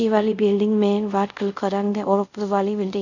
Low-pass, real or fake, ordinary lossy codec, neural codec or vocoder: 7.2 kHz; fake; none; codec, 24 kHz, 0.5 kbps, DualCodec